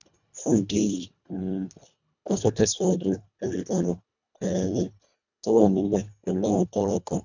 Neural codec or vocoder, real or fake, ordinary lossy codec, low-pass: codec, 24 kHz, 1.5 kbps, HILCodec; fake; none; 7.2 kHz